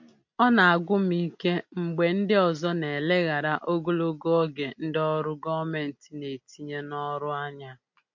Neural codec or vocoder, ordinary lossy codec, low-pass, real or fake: none; MP3, 48 kbps; 7.2 kHz; real